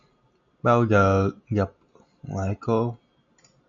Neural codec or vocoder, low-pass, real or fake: none; 7.2 kHz; real